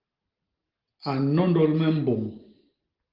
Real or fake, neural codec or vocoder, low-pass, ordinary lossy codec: real; none; 5.4 kHz; Opus, 16 kbps